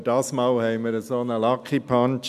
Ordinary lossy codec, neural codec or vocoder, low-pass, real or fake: none; none; 14.4 kHz; real